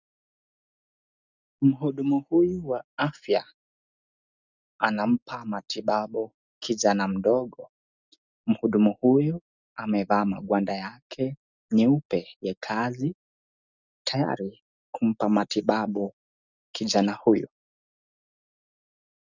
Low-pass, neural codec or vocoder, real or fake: 7.2 kHz; none; real